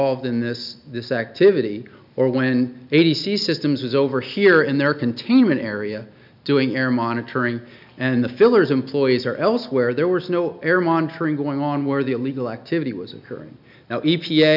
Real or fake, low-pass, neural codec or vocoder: real; 5.4 kHz; none